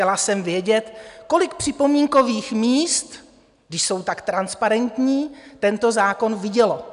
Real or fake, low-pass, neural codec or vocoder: real; 10.8 kHz; none